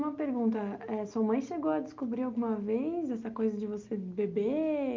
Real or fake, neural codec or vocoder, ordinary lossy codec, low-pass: real; none; Opus, 24 kbps; 7.2 kHz